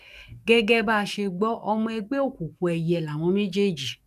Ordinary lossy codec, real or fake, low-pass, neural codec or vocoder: none; fake; 14.4 kHz; codec, 44.1 kHz, 7.8 kbps, DAC